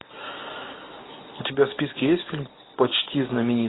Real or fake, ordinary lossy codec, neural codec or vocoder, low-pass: real; AAC, 16 kbps; none; 7.2 kHz